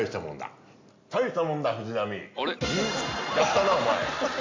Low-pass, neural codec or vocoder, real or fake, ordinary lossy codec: 7.2 kHz; none; real; MP3, 64 kbps